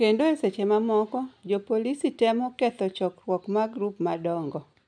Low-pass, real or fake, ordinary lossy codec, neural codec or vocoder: 9.9 kHz; real; none; none